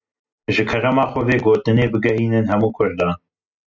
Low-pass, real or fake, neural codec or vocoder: 7.2 kHz; real; none